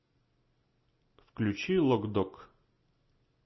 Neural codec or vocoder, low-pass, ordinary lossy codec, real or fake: none; 7.2 kHz; MP3, 24 kbps; real